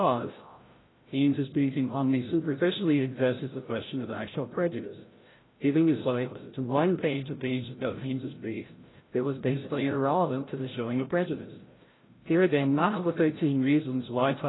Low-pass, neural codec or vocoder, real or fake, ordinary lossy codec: 7.2 kHz; codec, 16 kHz, 0.5 kbps, FreqCodec, larger model; fake; AAC, 16 kbps